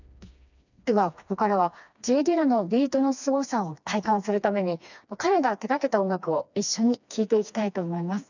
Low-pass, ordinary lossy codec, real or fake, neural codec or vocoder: 7.2 kHz; none; fake; codec, 16 kHz, 2 kbps, FreqCodec, smaller model